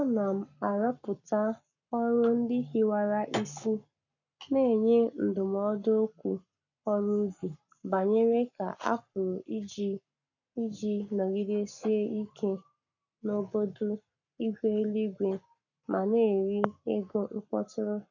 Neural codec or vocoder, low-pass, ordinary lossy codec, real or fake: codec, 44.1 kHz, 7.8 kbps, Pupu-Codec; 7.2 kHz; none; fake